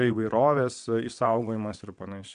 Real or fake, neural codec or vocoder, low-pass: fake; vocoder, 22.05 kHz, 80 mel bands, WaveNeXt; 9.9 kHz